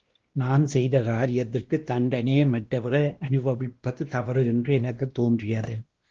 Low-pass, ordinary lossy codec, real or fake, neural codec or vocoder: 7.2 kHz; Opus, 16 kbps; fake; codec, 16 kHz, 1 kbps, X-Codec, WavLM features, trained on Multilingual LibriSpeech